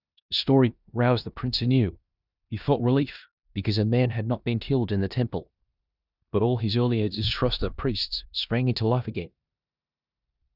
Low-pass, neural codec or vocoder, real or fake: 5.4 kHz; codec, 16 kHz in and 24 kHz out, 0.9 kbps, LongCat-Audio-Codec, four codebook decoder; fake